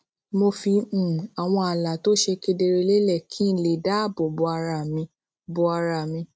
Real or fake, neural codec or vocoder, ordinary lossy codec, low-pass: real; none; none; none